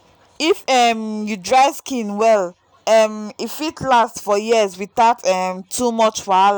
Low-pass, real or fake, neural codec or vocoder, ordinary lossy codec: none; real; none; none